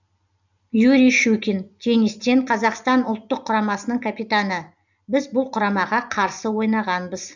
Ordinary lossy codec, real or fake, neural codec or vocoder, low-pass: none; real; none; 7.2 kHz